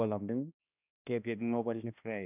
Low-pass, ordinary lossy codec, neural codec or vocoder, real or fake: 3.6 kHz; none; codec, 16 kHz, 1 kbps, X-Codec, HuBERT features, trained on balanced general audio; fake